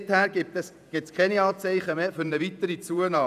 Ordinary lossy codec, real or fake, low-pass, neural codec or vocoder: none; fake; 14.4 kHz; vocoder, 48 kHz, 128 mel bands, Vocos